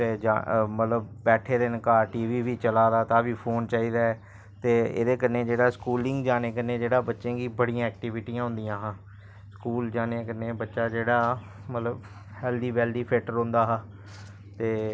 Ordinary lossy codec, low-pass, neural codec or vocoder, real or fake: none; none; none; real